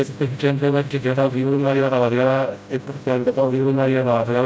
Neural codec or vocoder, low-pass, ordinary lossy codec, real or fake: codec, 16 kHz, 0.5 kbps, FreqCodec, smaller model; none; none; fake